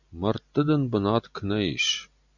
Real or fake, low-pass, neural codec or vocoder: real; 7.2 kHz; none